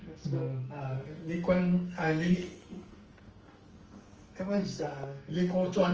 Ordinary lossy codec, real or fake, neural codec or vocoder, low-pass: Opus, 24 kbps; fake; codec, 44.1 kHz, 2.6 kbps, SNAC; 7.2 kHz